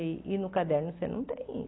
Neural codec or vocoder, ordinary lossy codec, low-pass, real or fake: none; AAC, 16 kbps; 7.2 kHz; real